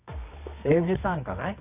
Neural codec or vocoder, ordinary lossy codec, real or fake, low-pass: codec, 24 kHz, 0.9 kbps, WavTokenizer, medium music audio release; none; fake; 3.6 kHz